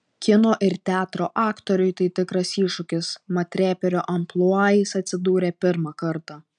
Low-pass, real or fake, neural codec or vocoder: 9.9 kHz; real; none